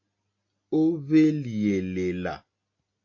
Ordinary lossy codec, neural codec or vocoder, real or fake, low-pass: AAC, 48 kbps; none; real; 7.2 kHz